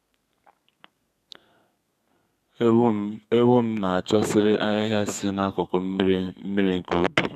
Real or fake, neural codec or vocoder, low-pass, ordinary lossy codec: fake; codec, 32 kHz, 1.9 kbps, SNAC; 14.4 kHz; none